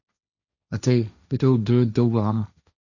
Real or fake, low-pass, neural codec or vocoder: fake; 7.2 kHz; codec, 16 kHz, 1.1 kbps, Voila-Tokenizer